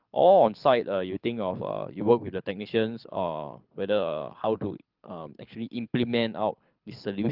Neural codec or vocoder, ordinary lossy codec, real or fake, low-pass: codec, 24 kHz, 6 kbps, HILCodec; Opus, 24 kbps; fake; 5.4 kHz